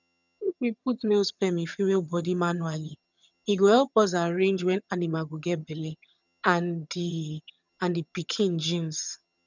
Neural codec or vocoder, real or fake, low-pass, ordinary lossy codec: vocoder, 22.05 kHz, 80 mel bands, HiFi-GAN; fake; 7.2 kHz; none